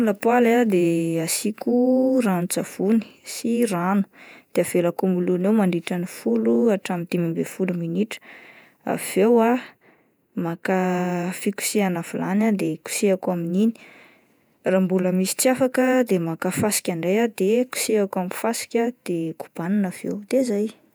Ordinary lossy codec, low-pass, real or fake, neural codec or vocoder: none; none; fake; vocoder, 48 kHz, 128 mel bands, Vocos